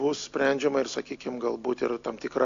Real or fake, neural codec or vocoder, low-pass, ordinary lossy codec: real; none; 7.2 kHz; AAC, 48 kbps